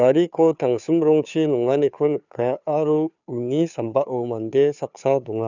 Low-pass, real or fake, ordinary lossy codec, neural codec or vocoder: 7.2 kHz; fake; none; codec, 16 kHz, 2 kbps, FunCodec, trained on Chinese and English, 25 frames a second